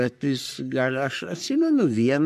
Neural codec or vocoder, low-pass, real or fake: codec, 44.1 kHz, 3.4 kbps, Pupu-Codec; 14.4 kHz; fake